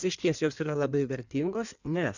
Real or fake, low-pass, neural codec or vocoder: fake; 7.2 kHz; codec, 16 kHz in and 24 kHz out, 1.1 kbps, FireRedTTS-2 codec